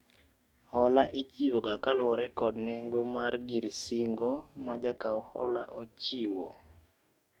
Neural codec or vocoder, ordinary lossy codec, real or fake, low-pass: codec, 44.1 kHz, 2.6 kbps, DAC; none; fake; 19.8 kHz